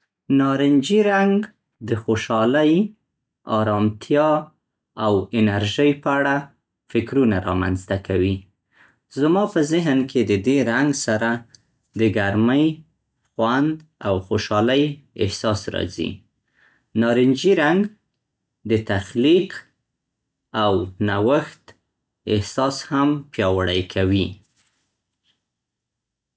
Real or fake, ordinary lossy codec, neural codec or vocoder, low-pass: real; none; none; none